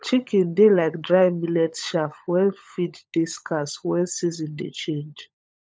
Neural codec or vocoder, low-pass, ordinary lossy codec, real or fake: codec, 16 kHz, 16 kbps, FunCodec, trained on LibriTTS, 50 frames a second; none; none; fake